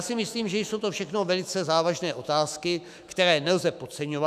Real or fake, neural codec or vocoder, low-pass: fake; autoencoder, 48 kHz, 128 numbers a frame, DAC-VAE, trained on Japanese speech; 14.4 kHz